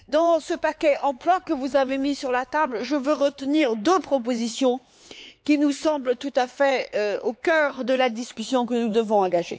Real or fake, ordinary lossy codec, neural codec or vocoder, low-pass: fake; none; codec, 16 kHz, 4 kbps, X-Codec, HuBERT features, trained on LibriSpeech; none